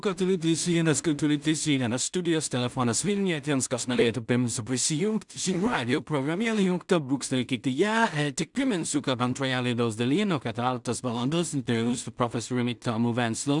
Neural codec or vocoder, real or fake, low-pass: codec, 16 kHz in and 24 kHz out, 0.4 kbps, LongCat-Audio-Codec, two codebook decoder; fake; 10.8 kHz